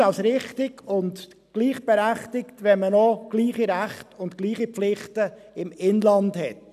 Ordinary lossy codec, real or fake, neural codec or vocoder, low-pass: none; real; none; 14.4 kHz